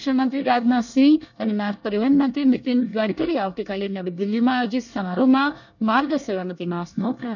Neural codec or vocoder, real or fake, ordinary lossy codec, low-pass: codec, 24 kHz, 1 kbps, SNAC; fake; none; 7.2 kHz